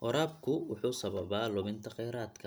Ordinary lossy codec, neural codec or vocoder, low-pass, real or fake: none; none; none; real